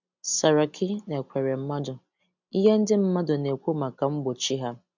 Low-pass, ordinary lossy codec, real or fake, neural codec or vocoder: 7.2 kHz; MP3, 64 kbps; real; none